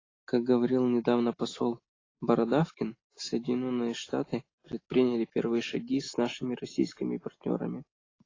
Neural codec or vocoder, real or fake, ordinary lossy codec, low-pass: none; real; AAC, 32 kbps; 7.2 kHz